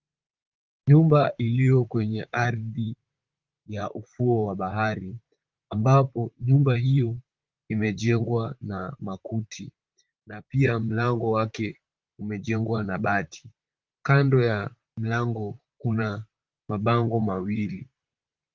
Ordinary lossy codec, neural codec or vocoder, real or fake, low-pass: Opus, 24 kbps; vocoder, 44.1 kHz, 128 mel bands, Pupu-Vocoder; fake; 7.2 kHz